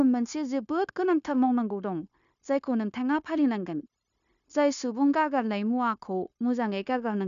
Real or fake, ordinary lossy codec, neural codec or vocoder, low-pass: fake; none; codec, 16 kHz, 0.9 kbps, LongCat-Audio-Codec; 7.2 kHz